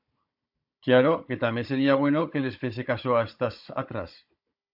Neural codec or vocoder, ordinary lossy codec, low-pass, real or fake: codec, 16 kHz, 16 kbps, FunCodec, trained on Chinese and English, 50 frames a second; AAC, 48 kbps; 5.4 kHz; fake